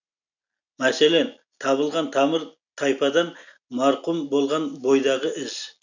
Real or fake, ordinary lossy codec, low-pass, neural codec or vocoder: real; none; 7.2 kHz; none